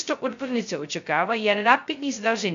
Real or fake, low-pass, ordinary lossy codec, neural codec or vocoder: fake; 7.2 kHz; MP3, 64 kbps; codec, 16 kHz, 0.2 kbps, FocalCodec